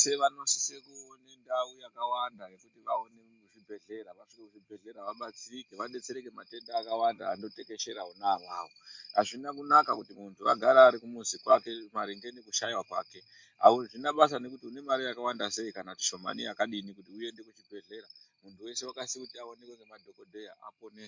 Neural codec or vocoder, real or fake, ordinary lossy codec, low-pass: none; real; MP3, 48 kbps; 7.2 kHz